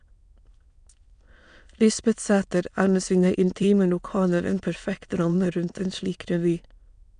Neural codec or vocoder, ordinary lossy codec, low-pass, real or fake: autoencoder, 22.05 kHz, a latent of 192 numbers a frame, VITS, trained on many speakers; MP3, 96 kbps; 9.9 kHz; fake